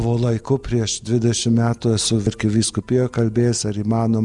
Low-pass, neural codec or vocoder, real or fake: 10.8 kHz; none; real